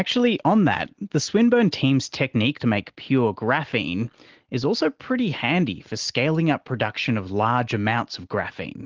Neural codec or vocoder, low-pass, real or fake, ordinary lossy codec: none; 7.2 kHz; real; Opus, 16 kbps